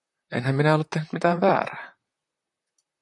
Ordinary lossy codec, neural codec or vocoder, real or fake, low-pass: MP3, 64 kbps; vocoder, 44.1 kHz, 128 mel bands, Pupu-Vocoder; fake; 10.8 kHz